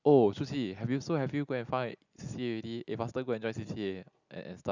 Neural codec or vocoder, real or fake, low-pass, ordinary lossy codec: none; real; 7.2 kHz; none